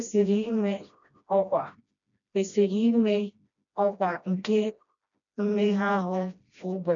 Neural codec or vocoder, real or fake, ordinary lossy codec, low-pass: codec, 16 kHz, 1 kbps, FreqCodec, smaller model; fake; none; 7.2 kHz